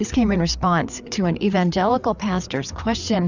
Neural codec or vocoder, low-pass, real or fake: codec, 16 kHz, 4 kbps, FreqCodec, larger model; 7.2 kHz; fake